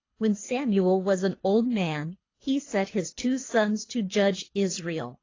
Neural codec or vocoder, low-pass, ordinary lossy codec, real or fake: codec, 24 kHz, 3 kbps, HILCodec; 7.2 kHz; AAC, 32 kbps; fake